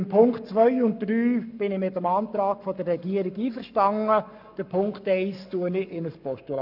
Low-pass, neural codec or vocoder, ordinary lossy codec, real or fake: 5.4 kHz; codec, 44.1 kHz, 7.8 kbps, Pupu-Codec; none; fake